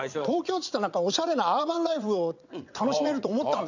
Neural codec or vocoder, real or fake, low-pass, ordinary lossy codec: vocoder, 22.05 kHz, 80 mel bands, WaveNeXt; fake; 7.2 kHz; none